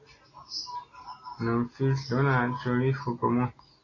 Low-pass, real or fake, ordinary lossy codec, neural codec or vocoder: 7.2 kHz; real; AAC, 32 kbps; none